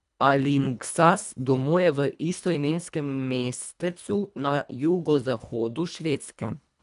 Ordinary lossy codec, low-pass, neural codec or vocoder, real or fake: none; 10.8 kHz; codec, 24 kHz, 1.5 kbps, HILCodec; fake